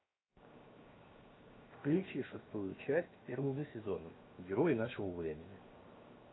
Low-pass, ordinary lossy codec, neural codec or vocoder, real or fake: 7.2 kHz; AAC, 16 kbps; codec, 16 kHz, 0.7 kbps, FocalCodec; fake